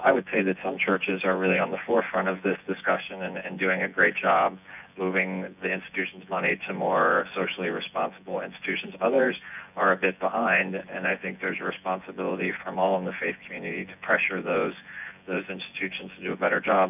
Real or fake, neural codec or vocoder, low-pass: fake; vocoder, 24 kHz, 100 mel bands, Vocos; 3.6 kHz